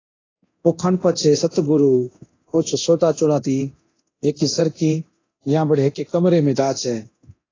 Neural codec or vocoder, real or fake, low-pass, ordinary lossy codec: codec, 24 kHz, 0.9 kbps, DualCodec; fake; 7.2 kHz; AAC, 32 kbps